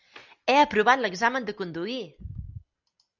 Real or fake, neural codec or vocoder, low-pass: real; none; 7.2 kHz